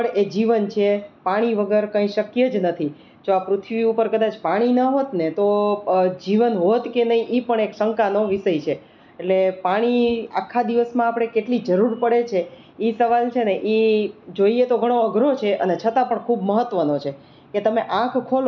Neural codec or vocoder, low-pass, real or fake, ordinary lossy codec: none; 7.2 kHz; real; none